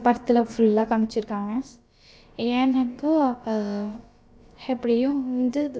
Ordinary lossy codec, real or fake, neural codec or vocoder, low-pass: none; fake; codec, 16 kHz, about 1 kbps, DyCAST, with the encoder's durations; none